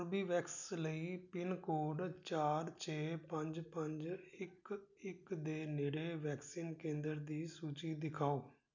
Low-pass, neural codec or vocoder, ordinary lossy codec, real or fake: 7.2 kHz; none; none; real